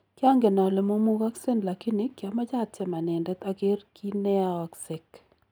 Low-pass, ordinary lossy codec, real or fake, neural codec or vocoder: none; none; real; none